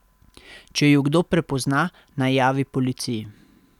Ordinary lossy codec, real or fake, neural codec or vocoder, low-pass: none; real; none; 19.8 kHz